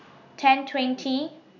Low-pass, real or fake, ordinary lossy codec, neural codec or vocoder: 7.2 kHz; real; none; none